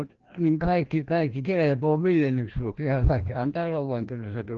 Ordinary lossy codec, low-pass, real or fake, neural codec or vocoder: Opus, 16 kbps; 7.2 kHz; fake; codec, 16 kHz, 1 kbps, FreqCodec, larger model